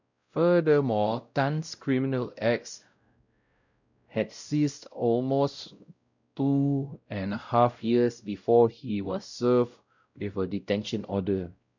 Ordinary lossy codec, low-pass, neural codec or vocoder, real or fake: none; 7.2 kHz; codec, 16 kHz, 0.5 kbps, X-Codec, WavLM features, trained on Multilingual LibriSpeech; fake